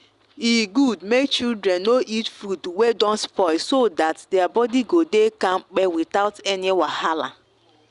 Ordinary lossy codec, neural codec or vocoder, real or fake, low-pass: Opus, 64 kbps; none; real; 10.8 kHz